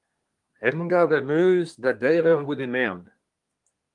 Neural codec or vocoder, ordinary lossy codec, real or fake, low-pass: codec, 24 kHz, 1 kbps, SNAC; Opus, 32 kbps; fake; 10.8 kHz